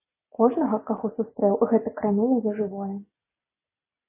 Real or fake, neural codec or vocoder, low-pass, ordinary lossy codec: real; none; 3.6 kHz; MP3, 16 kbps